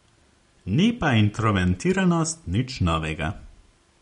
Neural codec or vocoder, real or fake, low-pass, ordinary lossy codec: none; real; 10.8 kHz; MP3, 48 kbps